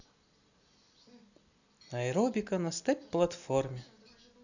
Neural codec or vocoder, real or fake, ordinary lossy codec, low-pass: none; real; none; 7.2 kHz